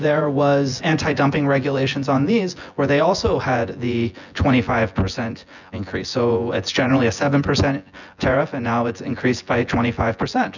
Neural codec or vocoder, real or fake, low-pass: vocoder, 24 kHz, 100 mel bands, Vocos; fake; 7.2 kHz